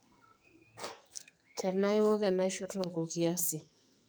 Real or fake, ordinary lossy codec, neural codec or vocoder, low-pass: fake; none; codec, 44.1 kHz, 2.6 kbps, SNAC; none